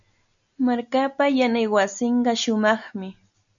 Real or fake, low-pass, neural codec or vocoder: real; 7.2 kHz; none